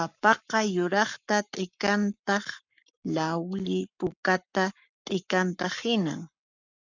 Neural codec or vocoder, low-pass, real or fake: codec, 44.1 kHz, 7.8 kbps, Pupu-Codec; 7.2 kHz; fake